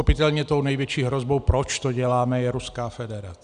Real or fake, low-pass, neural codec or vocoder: real; 9.9 kHz; none